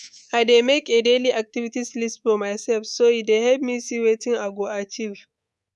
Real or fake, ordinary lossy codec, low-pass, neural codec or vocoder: fake; none; none; codec, 24 kHz, 3.1 kbps, DualCodec